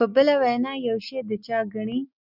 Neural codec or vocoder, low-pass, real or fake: none; 5.4 kHz; real